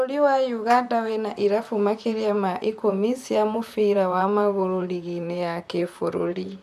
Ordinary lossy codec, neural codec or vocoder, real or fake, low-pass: none; vocoder, 44.1 kHz, 128 mel bands, Pupu-Vocoder; fake; 14.4 kHz